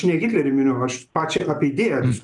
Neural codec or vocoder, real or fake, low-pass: none; real; 10.8 kHz